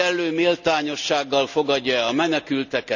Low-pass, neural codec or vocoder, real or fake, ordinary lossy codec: 7.2 kHz; none; real; none